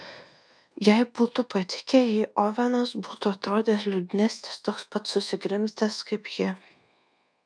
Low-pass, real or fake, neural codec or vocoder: 9.9 kHz; fake; codec, 24 kHz, 1.2 kbps, DualCodec